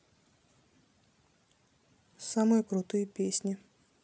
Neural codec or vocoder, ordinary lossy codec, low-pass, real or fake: none; none; none; real